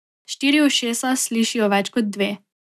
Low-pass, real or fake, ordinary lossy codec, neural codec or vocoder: 14.4 kHz; real; none; none